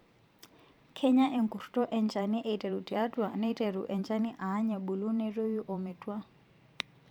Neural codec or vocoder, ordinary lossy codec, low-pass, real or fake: vocoder, 44.1 kHz, 128 mel bands every 512 samples, BigVGAN v2; none; 19.8 kHz; fake